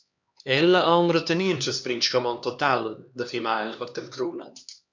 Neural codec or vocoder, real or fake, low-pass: codec, 16 kHz, 2 kbps, X-Codec, HuBERT features, trained on LibriSpeech; fake; 7.2 kHz